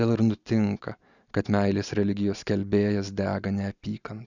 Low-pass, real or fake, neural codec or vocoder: 7.2 kHz; real; none